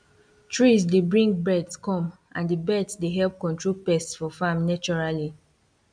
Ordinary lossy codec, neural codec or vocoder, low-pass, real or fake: none; none; 9.9 kHz; real